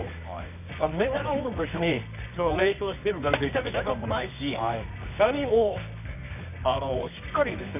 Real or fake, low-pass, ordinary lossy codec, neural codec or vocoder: fake; 3.6 kHz; none; codec, 24 kHz, 0.9 kbps, WavTokenizer, medium music audio release